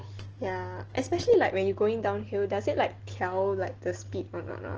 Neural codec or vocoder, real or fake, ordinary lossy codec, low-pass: none; real; Opus, 16 kbps; 7.2 kHz